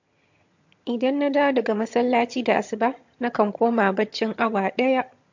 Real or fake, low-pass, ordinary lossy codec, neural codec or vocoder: fake; 7.2 kHz; MP3, 48 kbps; vocoder, 22.05 kHz, 80 mel bands, HiFi-GAN